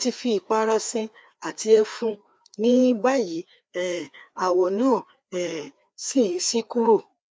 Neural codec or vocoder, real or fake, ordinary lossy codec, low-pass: codec, 16 kHz, 2 kbps, FreqCodec, larger model; fake; none; none